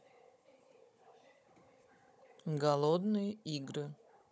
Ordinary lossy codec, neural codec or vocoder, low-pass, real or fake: none; codec, 16 kHz, 16 kbps, FunCodec, trained on Chinese and English, 50 frames a second; none; fake